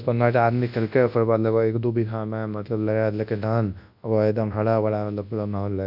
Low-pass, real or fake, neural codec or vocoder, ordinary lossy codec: 5.4 kHz; fake; codec, 24 kHz, 0.9 kbps, WavTokenizer, large speech release; AAC, 48 kbps